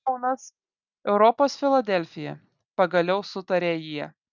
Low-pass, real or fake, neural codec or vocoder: 7.2 kHz; real; none